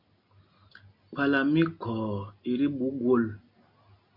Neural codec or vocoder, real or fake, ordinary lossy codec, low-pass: none; real; MP3, 48 kbps; 5.4 kHz